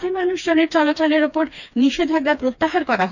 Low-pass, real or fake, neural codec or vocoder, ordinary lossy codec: 7.2 kHz; fake; codec, 16 kHz, 2 kbps, FreqCodec, smaller model; none